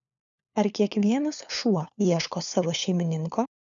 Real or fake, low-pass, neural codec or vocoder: fake; 7.2 kHz; codec, 16 kHz, 4 kbps, FunCodec, trained on LibriTTS, 50 frames a second